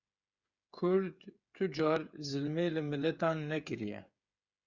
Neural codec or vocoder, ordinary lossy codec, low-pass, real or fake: codec, 16 kHz, 8 kbps, FreqCodec, smaller model; Opus, 64 kbps; 7.2 kHz; fake